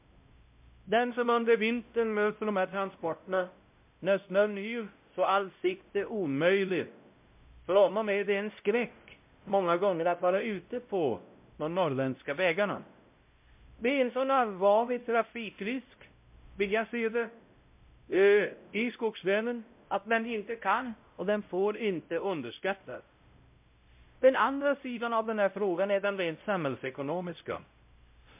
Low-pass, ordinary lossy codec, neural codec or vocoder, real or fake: 3.6 kHz; MP3, 32 kbps; codec, 16 kHz, 0.5 kbps, X-Codec, WavLM features, trained on Multilingual LibriSpeech; fake